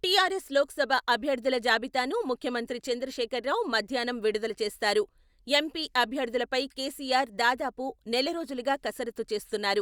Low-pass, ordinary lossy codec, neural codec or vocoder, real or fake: 19.8 kHz; Opus, 64 kbps; none; real